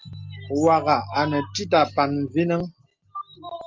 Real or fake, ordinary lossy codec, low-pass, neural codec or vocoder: real; Opus, 32 kbps; 7.2 kHz; none